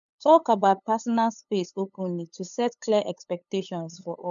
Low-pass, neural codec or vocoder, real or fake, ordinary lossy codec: 7.2 kHz; codec, 16 kHz, 8 kbps, FunCodec, trained on LibriTTS, 25 frames a second; fake; none